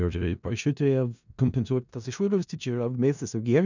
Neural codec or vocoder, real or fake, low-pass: codec, 16 kHz in and 24 kHz out, 0.4 kbps, LongCat-Audio-Codec, four codebook decoder; fake; 7.2 kHz